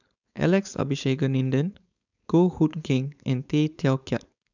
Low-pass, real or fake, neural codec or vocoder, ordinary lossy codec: 7.2 kHz; fake; codec, 16 kHz, 4.8 kbps, FACodec; none